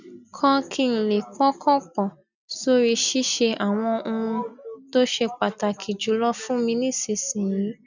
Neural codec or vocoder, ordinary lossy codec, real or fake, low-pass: none; none; real; 7.2 kHz